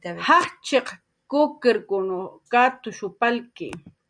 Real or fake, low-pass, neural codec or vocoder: real; 9.9 kHz; none